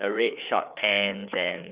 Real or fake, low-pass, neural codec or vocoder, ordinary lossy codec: fake; 3.6 kHz; codec, 16 kHz, 4 kbps, FreqCodec, larger model; Opus, 64 kbps